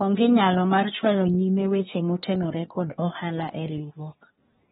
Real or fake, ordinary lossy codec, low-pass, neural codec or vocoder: fake; AAC, 16 kbps; 10.8 kHz; codec, 24 kHz, 1 kbps, SNAC